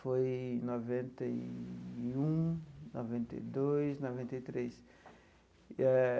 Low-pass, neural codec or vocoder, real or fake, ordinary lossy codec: none; none; real; none